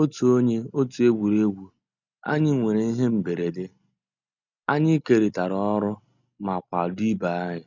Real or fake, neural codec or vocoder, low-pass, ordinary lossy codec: real; none; 7.2 kHz; none